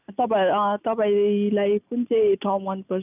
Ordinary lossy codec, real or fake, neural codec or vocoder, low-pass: none; real; none; 3.6 kHz